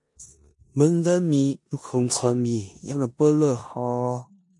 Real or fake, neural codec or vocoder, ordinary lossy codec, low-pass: fake; codec, 16 kHz in and 24 kHz out, 0.9 kbps, LongCat-Audio-Codec, four codebook decoder; MP3, 48 kbps; 10.8 kHz